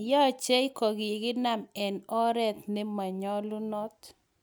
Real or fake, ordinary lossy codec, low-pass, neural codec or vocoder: real; none; none; none